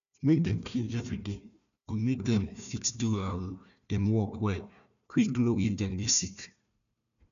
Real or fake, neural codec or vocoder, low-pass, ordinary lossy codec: fake; codec, 16 kHz, 1 kbps, FunCodec, trained on Chinese and English, 50 frames a second; 7.2 kHz; none